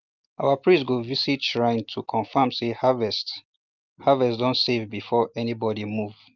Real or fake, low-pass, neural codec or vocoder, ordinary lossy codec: real; 7.2 kHz; none; Opus, 24 kbps